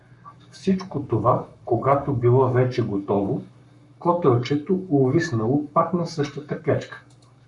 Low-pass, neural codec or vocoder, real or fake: 10.8 kHz; codec, 44.1 kHz, 7.8 kbps, Pupu-Codec; fake